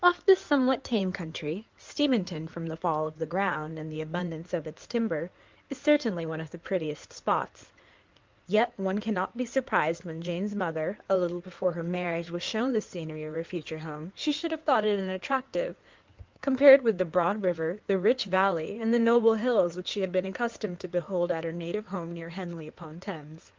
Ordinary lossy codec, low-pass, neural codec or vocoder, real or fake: Opus, 24 kbps; 7.2 kHz; codec, 16 kHz in and 24 kHz out, 2.2 kbps, FireRedTTS-2 codec; fake